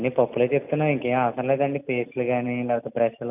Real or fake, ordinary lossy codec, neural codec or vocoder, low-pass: real; none; none; 3.6 kHz